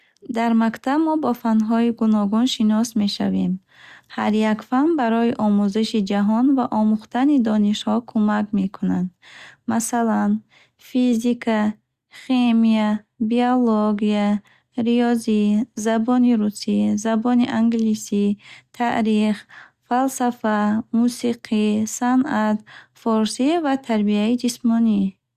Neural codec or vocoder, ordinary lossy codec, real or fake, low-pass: none; Opus, 64 kbps; real; 14.4 kHz